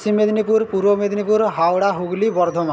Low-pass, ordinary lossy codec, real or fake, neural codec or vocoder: none; none; real; none